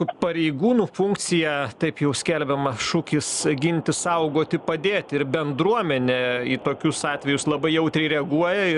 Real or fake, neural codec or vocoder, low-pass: real; none; 10.8 kHz